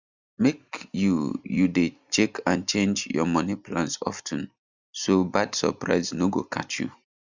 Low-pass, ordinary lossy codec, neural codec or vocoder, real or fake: 7.2 kHz; Opus, 64 kbps; none; real